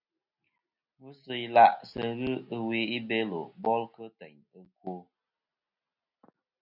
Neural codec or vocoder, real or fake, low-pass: none; real; 5.4 kHz